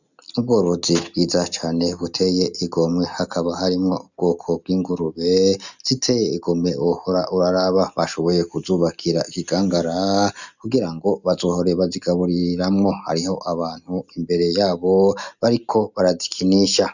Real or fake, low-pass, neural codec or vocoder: real; 7.2 kHz; none